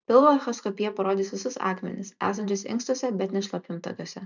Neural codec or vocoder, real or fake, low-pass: vocoder, 44.1 kHz, 128 mel bands every 512 samples, BigVGAN v2; fake; 7.2 kHz